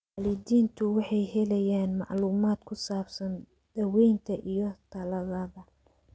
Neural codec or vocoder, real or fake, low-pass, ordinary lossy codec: none; real; none; none